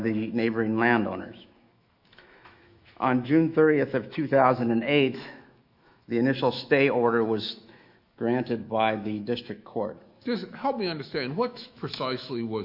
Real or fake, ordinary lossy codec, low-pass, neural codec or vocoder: fake; Opus, 64 kbps; 5.4 kHz; autoencoder, 48 kHz, 128 numbers a frame, DAC-VAE, trained on Japanese speech